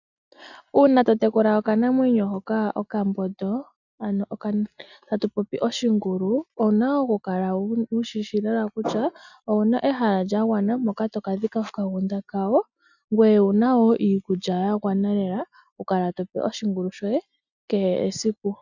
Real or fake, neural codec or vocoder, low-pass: real; none; 7.2 kHz